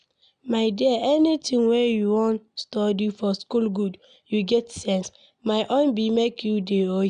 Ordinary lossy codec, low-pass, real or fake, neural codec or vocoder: none; 9.9 kHz; real; none